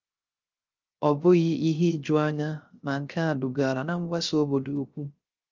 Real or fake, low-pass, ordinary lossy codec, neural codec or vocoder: fake; 7.2 kHz; Opus, 32 kbps; codec, 16 kHz, 0.3 kbps, FocalCodec